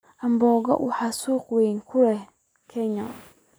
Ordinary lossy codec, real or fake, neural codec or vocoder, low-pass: none; real; none; none